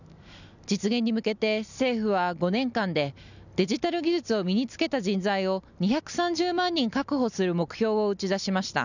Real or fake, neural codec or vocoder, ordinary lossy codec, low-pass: real; none; none; 7.2 kHz